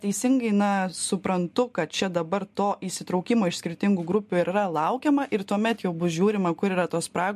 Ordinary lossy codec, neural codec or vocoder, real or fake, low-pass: MP3, 64 kbps; none; real; 14.4 kHz